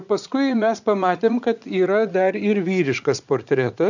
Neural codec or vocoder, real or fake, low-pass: vocoder, 44.1 kHz, 80 mel bands, Vocos; fake; 7.2 kHz